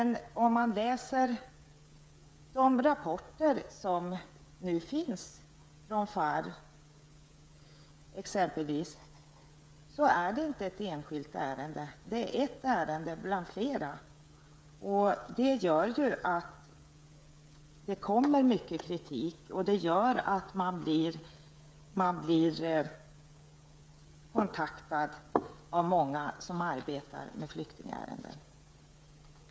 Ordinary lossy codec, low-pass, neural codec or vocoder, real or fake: none; none; codec, 16 kHz, 16 kbps, FreqCodec, smaller model; fake